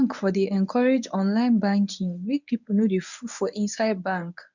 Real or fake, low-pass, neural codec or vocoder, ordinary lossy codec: fake; 7.2 kHz; codec, 24 kHz, 0.9 kbps, WavTokenizer, medium speech release version 1; none